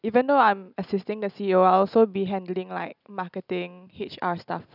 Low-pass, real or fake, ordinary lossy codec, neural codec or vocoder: 5.4 kHz; real; none; none